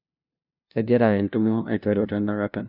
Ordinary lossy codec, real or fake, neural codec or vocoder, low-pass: none; fake; codec, 16 kHz, 0.5 kbps, FunCodec, trained on LibriTTS, 25 frames a second; 5.4 kHz